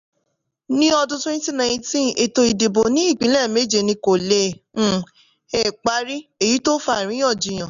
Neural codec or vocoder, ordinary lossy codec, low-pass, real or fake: none; none; 7.2 kHz; real